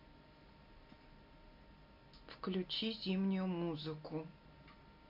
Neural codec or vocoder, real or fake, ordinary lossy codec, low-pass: none; real; MP3, 48 kbps; 5.4 kHz